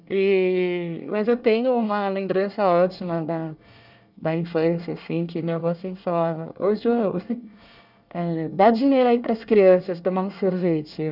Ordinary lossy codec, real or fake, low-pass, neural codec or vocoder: none; fake; 5.4 kHz; codec, 24 kHz, 1 kbps, SNAC